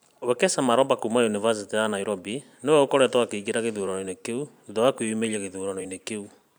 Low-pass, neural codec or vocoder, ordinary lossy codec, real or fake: none; vocoder, 44.1 kHz, 128 mel bands every 512 samples, BigVGAN v2; none; fake